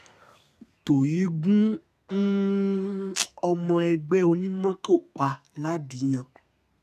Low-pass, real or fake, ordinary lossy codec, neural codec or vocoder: 14.4 kHz; fake; none; codec, 32 kHz, 1.9 kbps, SNAC